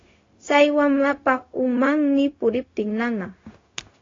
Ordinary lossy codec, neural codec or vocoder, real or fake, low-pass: AAC, 32 kbps; codec, 16 kHz, 0.4 kbps, LongCat-Audio-Codec; fake; 7.2 kHz